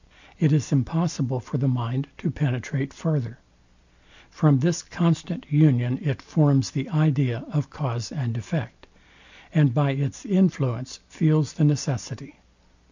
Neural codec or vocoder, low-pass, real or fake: none; 7.2 kHz; real